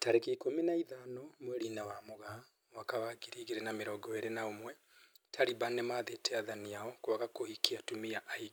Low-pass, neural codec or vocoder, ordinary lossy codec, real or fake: none; none; none; real